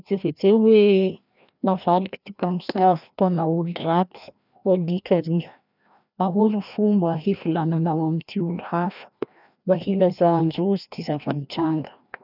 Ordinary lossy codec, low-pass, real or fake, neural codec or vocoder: none; 5.4 kHz; fake; codec, 16 kHz, 1 kbps, FreqCodec, larger model